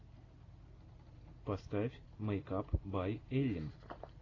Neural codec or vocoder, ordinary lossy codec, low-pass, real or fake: none; AAC, 32 kbps; 7.2 kHz; real